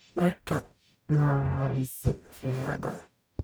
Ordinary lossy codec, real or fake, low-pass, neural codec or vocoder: none; fake; none; codec, 44.1 kHz, 0.9 kbps, DAC